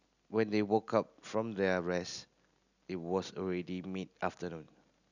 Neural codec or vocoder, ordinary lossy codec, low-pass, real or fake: none; none; 7.2 kHz; real